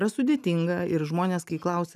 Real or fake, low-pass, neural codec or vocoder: real; 14.4 kHz; none